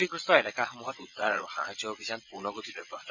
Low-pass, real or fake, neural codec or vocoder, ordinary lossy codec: 7.2 kHz; fake; vocoder, 22.05 kHz, 80 mel bands, WaveNeXt; none